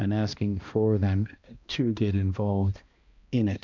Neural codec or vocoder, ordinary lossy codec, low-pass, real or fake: codec, 16 kHz, 1 kbps, X-Codec, HuBERT features, trained on balanced general audio; MP3, 64 kbps; 7.2 kHz; fake